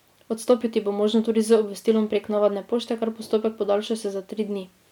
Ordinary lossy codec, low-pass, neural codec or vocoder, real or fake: none; 19.8 kHz; none; real